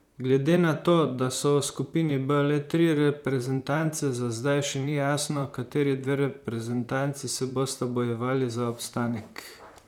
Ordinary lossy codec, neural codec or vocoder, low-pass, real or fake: none; vocoder, 44.1 kHz, 128 mel bands, Pupu-Vocoder; 19.8 kHz; fake